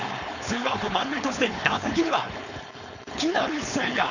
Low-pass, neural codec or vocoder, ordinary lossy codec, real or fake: 7.2 kHz; codec, 16 kHz, 4.8 kbps, FACodec; none; fake